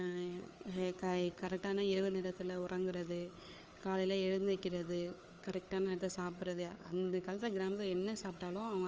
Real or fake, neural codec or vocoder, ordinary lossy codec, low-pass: fake; codec, 16 kHz, 4 kbps, FunCodec, trained on Chinese and English, 50 frames a second; Opus, 24 kbps; 7.2 kHz